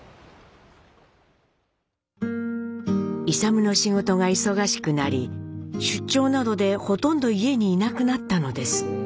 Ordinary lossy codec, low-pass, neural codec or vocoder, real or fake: none; none; none; real